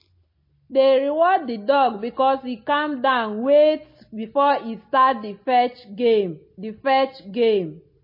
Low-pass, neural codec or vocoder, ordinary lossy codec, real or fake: 5.4 kHz; none; MP3, 24 kbps; real